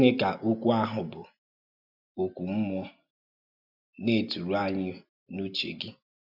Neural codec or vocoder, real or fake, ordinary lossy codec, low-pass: none; real; none; 5.4 kHz